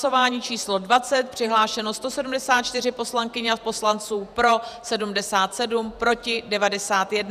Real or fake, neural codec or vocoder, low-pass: fake; vocoder, 48 kHz, 128 mel bands, Vocos; 14.4 kHz